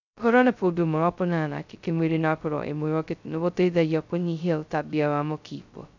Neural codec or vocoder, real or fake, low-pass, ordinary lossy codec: codec, 16 kHz, 0.2 kbps, FocalCodec; fake; 7.2 kHz; none